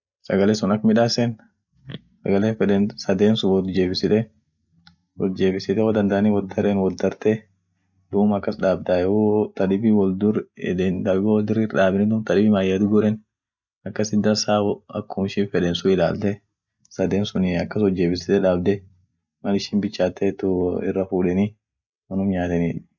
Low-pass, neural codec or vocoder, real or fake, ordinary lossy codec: 7.2 kHz; none; real; none